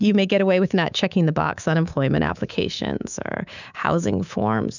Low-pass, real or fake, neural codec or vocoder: 7.2 kHz; fake; codec, 24 kHz, 3.1 kbps, DualCodec